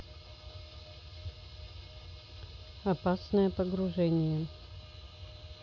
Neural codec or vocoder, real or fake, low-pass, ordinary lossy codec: none; real; 7.2 kHz; none